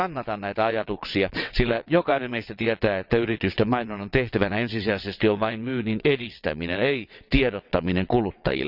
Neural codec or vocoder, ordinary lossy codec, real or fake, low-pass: vocoder, 22.05 kHz, 80 mel bands, WaveNeXt; none; fake; 5.4 kHz